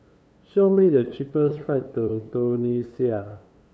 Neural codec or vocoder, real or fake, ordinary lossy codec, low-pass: codec, 16 kHz, 2 kbps, FunCodec, trained on LibriTTS, 25 frames a second; fake; none; none